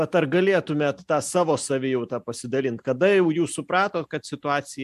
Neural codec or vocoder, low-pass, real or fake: none; 14.4 kHz; real